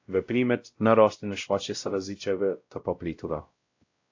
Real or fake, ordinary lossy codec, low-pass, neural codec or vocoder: fake; AAC, 48 kbps; 7.2 kHz; codec, 16 kHz, 0.5 kbps, X-Codec, WavLM features, trained on Multilingual LibriSpeech